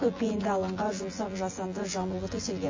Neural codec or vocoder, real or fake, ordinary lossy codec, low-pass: vocoder, 24 kHz, 100 mel bands, Vocos; fake; MP3, 32 kbps; 7.2 kHz